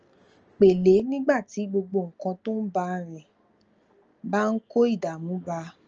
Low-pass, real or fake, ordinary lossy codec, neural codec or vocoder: 7.2 kHz; real; Opus, 24 kbps; none